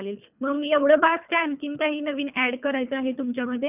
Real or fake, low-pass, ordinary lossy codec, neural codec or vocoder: fake; 3.6 kHz; none; codec, 24 kHz, 3 kbps, HILCodec